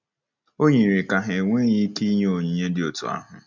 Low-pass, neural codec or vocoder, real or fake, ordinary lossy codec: 7.2 kHz; none; real; none